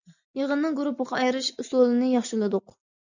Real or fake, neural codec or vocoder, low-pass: real; none; 7.2 kHz